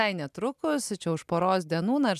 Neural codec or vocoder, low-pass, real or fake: none; 14.4 kHz; real